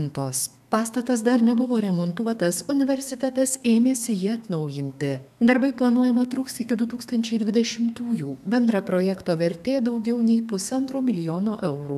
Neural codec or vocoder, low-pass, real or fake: codec, 32 kHz, 1.9 kbps, SNAC; 14.4 kHz; fake